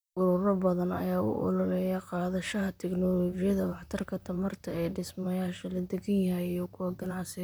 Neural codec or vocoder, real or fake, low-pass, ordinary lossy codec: vocoder, 44.1 kHz, 128 mel bands, Pupu-Vocoder; fake; none; none